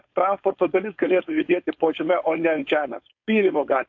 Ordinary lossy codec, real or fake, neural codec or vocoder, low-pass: AAC, 48 kbps; fake; codec, 16 kHz, 4.8 kbps, FACodec; 7.2 kHz